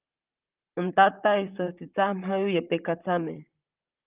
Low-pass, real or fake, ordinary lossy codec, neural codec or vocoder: 3.6 kHz; fake; Opus, 24 kbps; vocoder, 44.1 kHz, 128 mel bands, Pupu-Vocoder